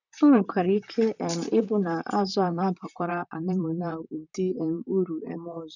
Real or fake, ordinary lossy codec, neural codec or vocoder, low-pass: fake; none; vocoder, 44.1 kHz, 128 mel bands, Pupu-Vocoder; 7.2 kHz